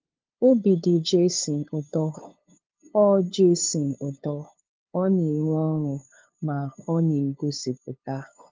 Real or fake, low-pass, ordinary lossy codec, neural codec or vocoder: fake; 7.2 kHz; Opus, 32 kbps; codec, 16 kHz, 2 kbps, FunCodec, trained on LibriTTS, 25 frames a second